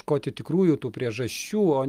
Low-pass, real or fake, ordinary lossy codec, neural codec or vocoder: 14.4 kHz; real; Opus, 32 kbps; none